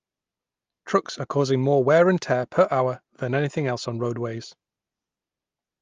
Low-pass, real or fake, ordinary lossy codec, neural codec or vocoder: 7.2 kHz; real; Opus, 32 kbps; none